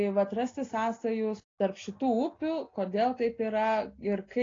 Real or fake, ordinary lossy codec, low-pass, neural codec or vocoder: real; AAC, 32 kbps; 7.2 kHz; none